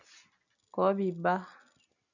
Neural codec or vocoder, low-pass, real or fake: none; 7.2 kHz; real